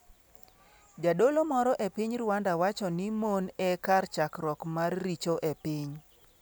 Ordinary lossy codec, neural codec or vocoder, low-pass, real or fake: none; none; none; real